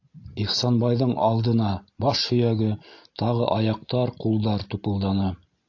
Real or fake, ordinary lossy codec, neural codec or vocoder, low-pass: real; AAC, 48 kbps; none; 7.2 kHz